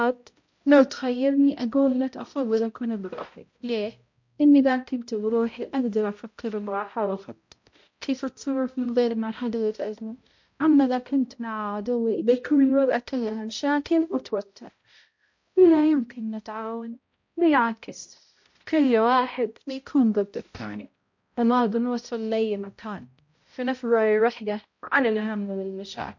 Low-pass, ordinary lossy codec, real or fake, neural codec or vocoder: 7.2 kHz; MP3, 48 kbps; fake; codec, 16 kHz, 0.5 kbps, X-Codec, HuBERT features, trained on balanced general audio